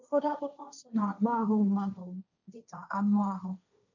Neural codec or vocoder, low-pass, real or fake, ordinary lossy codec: codec, 16 kHz, 1.1 kbps, Voila-Tokenizer; 7.2 kHz; fake; none